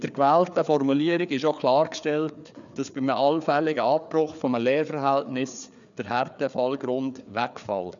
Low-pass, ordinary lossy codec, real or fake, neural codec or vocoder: 7.2 kHz; none; fake; codec, 16 kHz, 4 kbps, FreqCodec, larger model